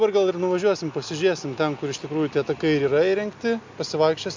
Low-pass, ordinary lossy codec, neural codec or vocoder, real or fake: 7.2 kHz; MP3, 64 kbps; none; real